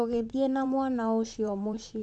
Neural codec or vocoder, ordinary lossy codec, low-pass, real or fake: vocoder, 44.1 kHz, 128 mel bands, Pupu-Vocoder; AAC, 64 kbps; 10.8 kHz; fake